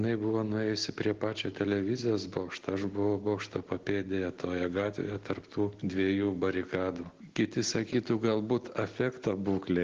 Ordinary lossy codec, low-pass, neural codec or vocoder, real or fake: Opus, 16 kbps; 7.2 kHz; none; real